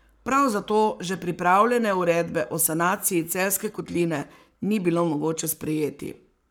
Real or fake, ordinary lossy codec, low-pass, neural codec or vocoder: fake; none; none; codec, 44.1 kHz, 7.8 kbps, Pupu-Codec